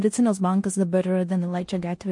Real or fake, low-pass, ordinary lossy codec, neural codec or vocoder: fake; 10.8 kHz; MP3, 48 kbps; codec, 16 kHz in and 24 kHz out, 0.9 kbps, LongCat-Audio-Codec, four codebook decoder